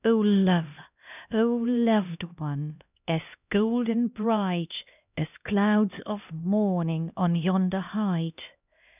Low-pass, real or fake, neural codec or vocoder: 3.6 kHz; fake; codec, 16 kHz, 1 kbps, X-Codec, HuBERT features, trained on LibriSpeech